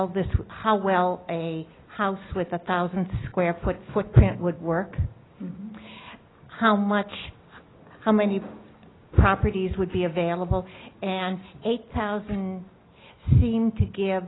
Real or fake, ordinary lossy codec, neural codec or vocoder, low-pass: real; AAC, 16 kbps; none; 7.2 kHz